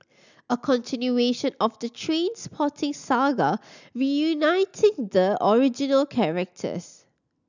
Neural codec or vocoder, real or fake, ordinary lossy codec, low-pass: none; real; none; 7.2 kHz